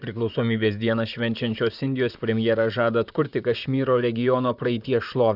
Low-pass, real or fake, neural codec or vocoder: 5.4 kHz; fake; vocoder, 44.1 kHz, 128 mel bands, Pupu-Vocoder